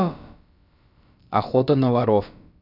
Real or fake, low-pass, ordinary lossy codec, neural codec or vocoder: fake; 5.4 kHz; none; codec, 16 kHz, about 1 kbps, DyCAST, with the encoder's durations